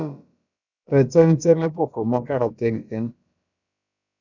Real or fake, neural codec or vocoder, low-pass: fake; codec, 16 kHz, about 1 kbps, DyCAST, with the encoder's durations; 7.2 kHz